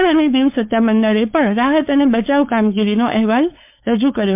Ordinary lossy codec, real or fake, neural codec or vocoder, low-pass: MP3, 32 kbps; fake; codec, 16 kHz, 4.8 kbps, FACodec; 3.6 kHz